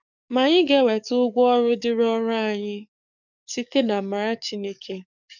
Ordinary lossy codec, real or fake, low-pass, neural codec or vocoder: none; fake; 7.2 kHz; codec, 44.1 kHz, 7.8 kbps, DAC